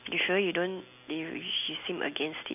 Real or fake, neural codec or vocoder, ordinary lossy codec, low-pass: real; none; none; 3.6 kHz